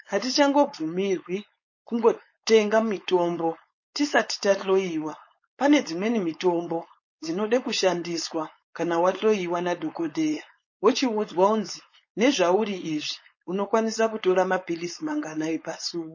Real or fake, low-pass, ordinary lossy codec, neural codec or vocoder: fake; 7.2 kHz; MP3, 32 kbps; codec, 16 kHz, 4.8 kbps, FACodec